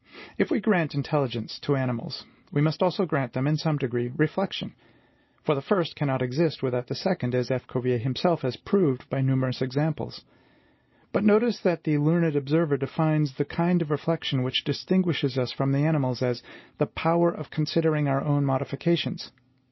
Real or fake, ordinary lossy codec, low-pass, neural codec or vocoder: real; MP3, 24 kbps; 7.2 kHz; none